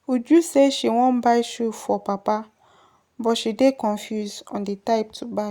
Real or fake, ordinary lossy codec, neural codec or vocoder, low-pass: real; Opus, 64 kbps; none; 19.8 kHz